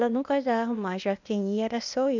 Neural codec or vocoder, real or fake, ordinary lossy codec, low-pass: codec, 16 kHz, 0.8 kbps, ZipCodec; fake; none; 7.2 kHz